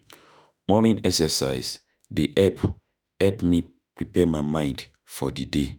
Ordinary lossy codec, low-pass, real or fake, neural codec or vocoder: none; none; fake; autoencoder, 48 kHz, 32 numbers a frame, DAC-VAE, trained on Japanese speech